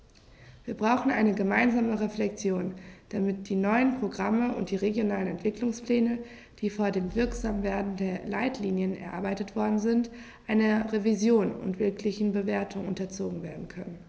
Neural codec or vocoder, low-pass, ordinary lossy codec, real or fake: none; none; none; real